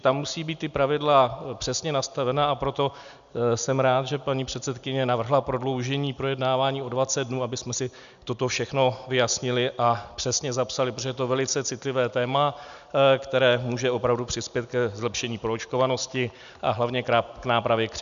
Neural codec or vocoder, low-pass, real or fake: none; 7.2 kHz; real